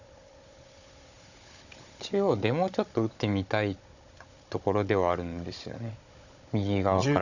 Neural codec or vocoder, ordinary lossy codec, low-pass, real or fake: codec, 16 kHz, 16 kbps, FunCodec, trained on Chinese and English, 50 frames a second; none; 7.2 kHz; fake